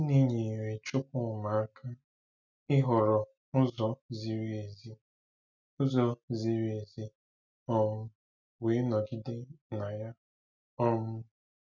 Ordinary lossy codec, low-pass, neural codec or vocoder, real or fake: none; 7.2 kHz; none; real